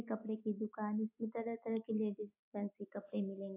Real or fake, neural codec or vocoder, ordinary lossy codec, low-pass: real; none; AAC, 32 kbps; 3.6 kHz